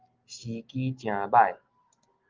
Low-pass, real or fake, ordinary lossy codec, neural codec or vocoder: 7.2 kHz; real; Opus, 32 kbps; none